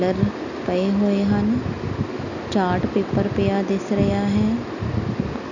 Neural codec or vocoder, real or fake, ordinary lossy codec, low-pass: none; real; none; 7.2 kHz